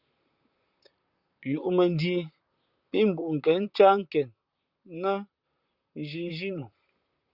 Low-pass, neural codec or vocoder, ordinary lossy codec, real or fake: 5.4 kHz; vocoder, 44.1 kHz, 128 mel bands, Pupu-Vocoder; Opus, 64 kbps; fake